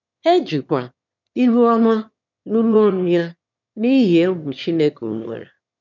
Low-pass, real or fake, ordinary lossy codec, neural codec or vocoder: 7.2 kHz; fake; none; autoencoder, 22.05 kHz, a latent of 192 numbers a frame, VITS, trained on one speaker